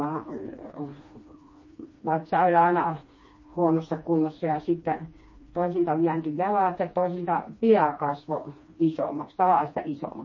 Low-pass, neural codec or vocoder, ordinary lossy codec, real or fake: 7.2 kHz; codec, 16 kHz, 2 kbps, FreqCodec, smaller model; MP3, 32 kbps; fake